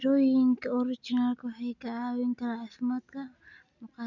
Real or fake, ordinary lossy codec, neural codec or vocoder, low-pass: real; none; none; 7.2 kHz